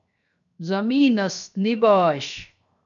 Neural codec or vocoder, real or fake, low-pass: codec, 16 kHz, 0.7 kbps, FocalCodec; fake; 7.2 kHz